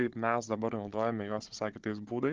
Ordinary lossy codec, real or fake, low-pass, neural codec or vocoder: Opus, 16 kbps; fake; 7.2 kHz; codec, 16 kHz, 16 kbps, FunCodec, trained on Chinese and English, 50 frames a second